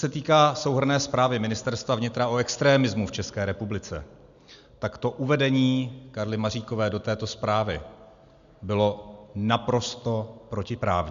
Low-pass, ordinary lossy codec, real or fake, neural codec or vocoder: 7.2 kHz; AAC, 96 kbps; real; none